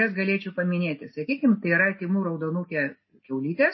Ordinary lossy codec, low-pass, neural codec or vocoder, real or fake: MP3, 24 kbps; 7.2 kHz; none; real